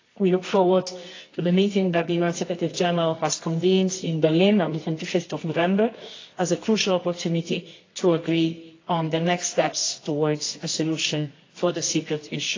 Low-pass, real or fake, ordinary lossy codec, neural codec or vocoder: 7.2 kHz; fake; AAC, 32 kbps; codec, 24 kHz, 0.9 kbps, WavTokenizer, medium music audio release